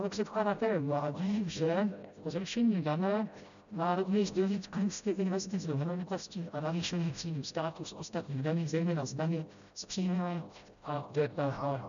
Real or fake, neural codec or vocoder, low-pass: fake; codec, 16 kHz, 0.5 kbps, FreqCodec, smaller model; 7.2 kHz